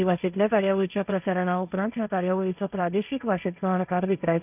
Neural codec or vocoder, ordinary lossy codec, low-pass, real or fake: codec, 16 kHz, 1.1 kbps, Voila-Tokenizer; none; 3.6 kHz; fake